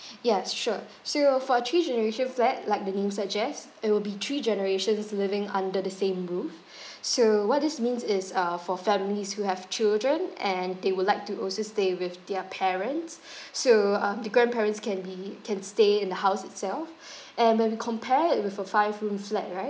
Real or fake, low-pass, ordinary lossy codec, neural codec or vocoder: real; none; none; none